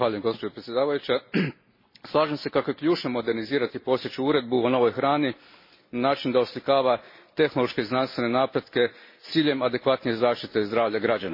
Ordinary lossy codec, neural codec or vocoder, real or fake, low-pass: MP3, 24 kbps; none; real; 5.4 kHz